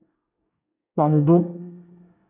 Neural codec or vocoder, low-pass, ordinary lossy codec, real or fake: codec, 24 kHz, 1 kbps, SNAC; 3.6 kHz; AAC, 32 kbps; fake